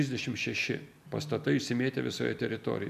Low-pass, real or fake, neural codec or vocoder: 14.4 kHz; real; none